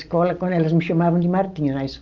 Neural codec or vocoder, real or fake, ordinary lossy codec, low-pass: none; real; Opus, 24 kbps; 7.2 kHz